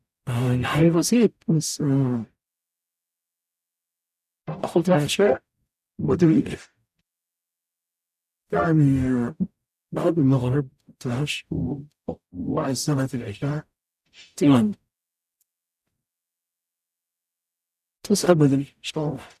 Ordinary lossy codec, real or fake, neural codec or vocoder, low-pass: none; fake; codec, 44.1 kHz, 0.9 kbps, DAC; 14.4 kHz